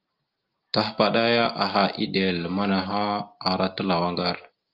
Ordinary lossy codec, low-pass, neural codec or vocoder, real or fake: Opus, 24 kbps; 5.4 kHz; none; real